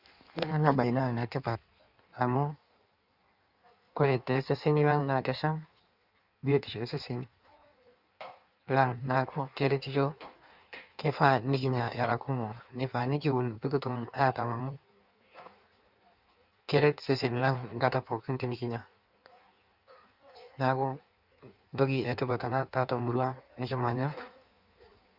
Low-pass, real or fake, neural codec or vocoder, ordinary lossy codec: 5.4 kHz; fake; codec, 16 kHz in and 24 kHz out, 1.1 kbps, FireRedTTS-2 codec; Opus, 64 kbps